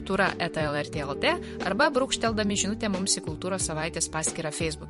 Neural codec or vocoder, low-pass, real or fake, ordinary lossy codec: vocoder, 44.1 kHz, 128 mel bands every 256 samples, BigVGAN v2; 14.4 kHz; fake; MP3, 48 kbps